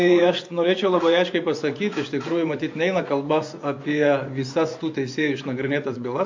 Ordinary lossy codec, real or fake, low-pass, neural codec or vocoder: MP3, 48 kbps; fake; 7.2 kHz; vocoder, 24 kHz, 100 mel bands, Vocos